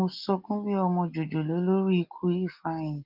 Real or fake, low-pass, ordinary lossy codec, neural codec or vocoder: real; 5.4 kHz; Opus, 32 kbps; none